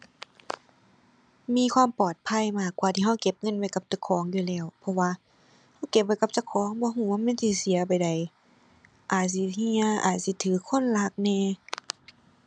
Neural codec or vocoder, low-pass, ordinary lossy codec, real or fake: none; 9.9 kHz; none; real